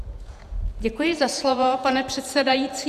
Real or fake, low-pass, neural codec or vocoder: fake; 14.4 kHz; vocoder, 48 kHz, 128 mel bands, Vocos